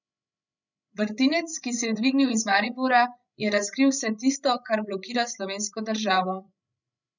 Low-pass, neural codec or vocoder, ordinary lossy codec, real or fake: 7.2 kHz; codec, 16 kHz, 16 kbps, FreqCodec, larger model; none; fake